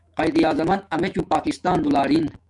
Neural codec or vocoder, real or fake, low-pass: autoencoder, 48 kHz, 128 numbers a frame, DAC-VAE, trained on Japanese speech; fake; 10.8 kHz